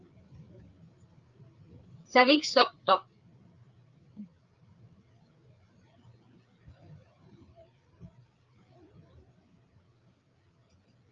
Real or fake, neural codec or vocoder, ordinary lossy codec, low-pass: fake; codec, 16 kHz, 4 kbps, FreqCodec, larger model; Opus, 24 kbps; 7.2 kHz